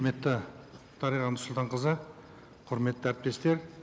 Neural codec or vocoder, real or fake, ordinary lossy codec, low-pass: none; real; none; none